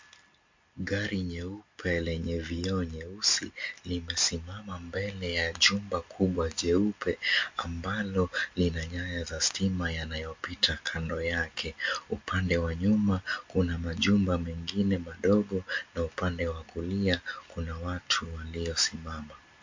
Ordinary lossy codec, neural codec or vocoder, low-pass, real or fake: MP3, 48 kbps; none; 7.2 kHz; real